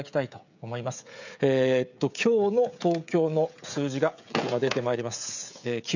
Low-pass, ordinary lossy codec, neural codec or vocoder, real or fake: 7.2 kHz; none; codec, 16 kHz, 16 kbps, FreqCodec, smaller model; fake